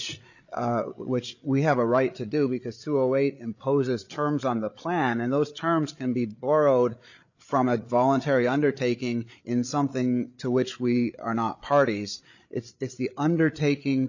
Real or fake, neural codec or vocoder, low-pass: fake; codec, 16 kHz, 8 kbps, FreqCodec, larger model; 7.2 kHz